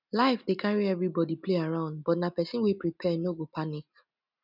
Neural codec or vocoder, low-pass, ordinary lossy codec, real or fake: none; 5.4 kHz; none; real